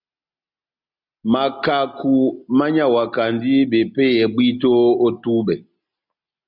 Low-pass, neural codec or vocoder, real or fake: 5.4 kHz; none; real